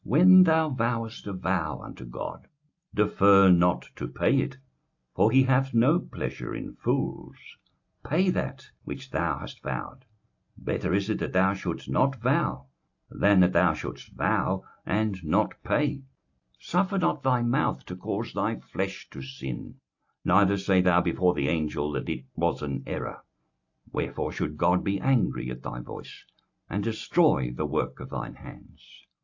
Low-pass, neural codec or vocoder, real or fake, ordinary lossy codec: 7.2 kHz; none; real; AAC, 48 kbps